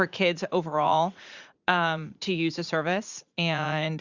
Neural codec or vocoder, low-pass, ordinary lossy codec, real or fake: vocoder, 44.1 kHz, 80 mel bands, Vocos; 7.2 kHz; Opus, 64 kbps; fake